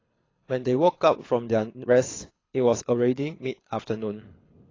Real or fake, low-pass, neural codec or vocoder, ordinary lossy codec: fake; 7.2 kHz; codec, 24 kHz, 6 kbps, HILCodec; AAC, 32 kbps